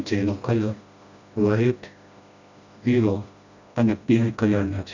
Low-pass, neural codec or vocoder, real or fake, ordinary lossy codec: 7.2 kHz; codec, 16 kHz, 1 kbps, FreqCodec, smaller model; fake; none